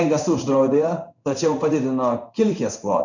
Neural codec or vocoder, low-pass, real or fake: codec, 16 kHz in and 24 kHz out, 1 kbps, XY-Tokenizer; 7.2 kHz; fake